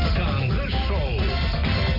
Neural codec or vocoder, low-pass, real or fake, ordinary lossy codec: none; 5.4 kHz; real; none